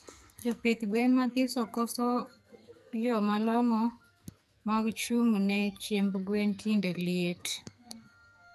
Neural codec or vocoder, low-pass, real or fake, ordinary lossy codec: codec, 44.1 kHz, 2.6 kbps, SNAC; 14.4 kHz; fake; none